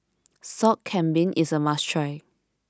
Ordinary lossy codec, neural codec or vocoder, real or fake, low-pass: none; none; real; none